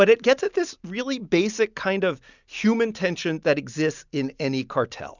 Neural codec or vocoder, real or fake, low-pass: none; real; 7.2 kHz